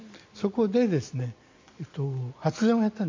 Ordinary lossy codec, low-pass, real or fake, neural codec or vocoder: MP3, 48 kbps; 7.2 kHz; real; none